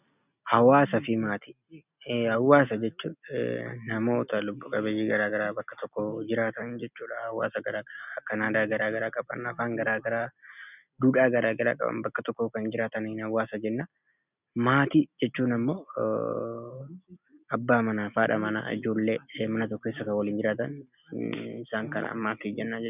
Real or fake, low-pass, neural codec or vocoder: real; 3.6 kHz; none